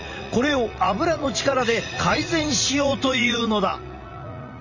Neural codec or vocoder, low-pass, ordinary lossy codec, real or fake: vocoder, 44.1 kHz, 80 mel bands, Vocos; 7.2 kHz; none; fake